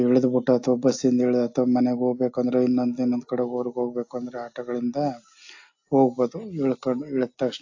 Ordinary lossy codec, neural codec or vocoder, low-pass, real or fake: AAC, 48 kbps; none; 7.2 kHz; real